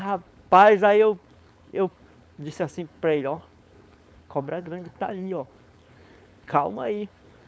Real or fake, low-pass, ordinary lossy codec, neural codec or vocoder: fake; none; none; codec, 16 kHz, 4.8 kbps, FACodec